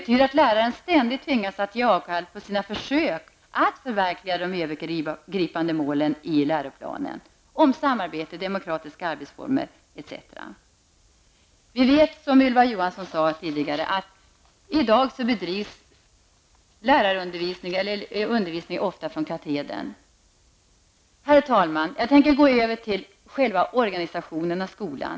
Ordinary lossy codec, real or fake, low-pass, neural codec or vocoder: none; real; none; none